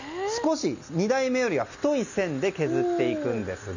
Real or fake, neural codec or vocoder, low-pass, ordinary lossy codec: real; none; 7.2 kHz; none